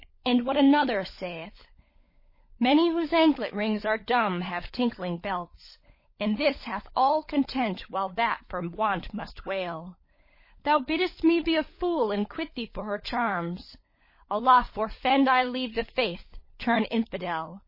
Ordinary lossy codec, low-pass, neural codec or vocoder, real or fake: MP3, 24 kbps; 5.4 kHz; codec, 16 kHz, 16 kbps, FunCodec, trained on LibriTTS, 50 frames a second; fake